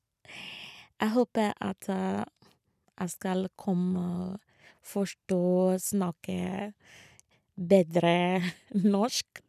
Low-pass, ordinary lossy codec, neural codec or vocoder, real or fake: 14.4 kHz; none; none; real